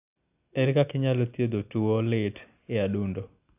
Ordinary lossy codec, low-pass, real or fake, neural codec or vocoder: AAC, 32 kbps; 3.6 kHz; real; none